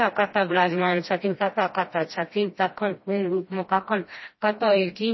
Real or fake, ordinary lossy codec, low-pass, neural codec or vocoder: fake; MP3, 24 kbps; 7.2 kHz; codec, 16 kHz, 1 kbps, FreqCodec, smaller model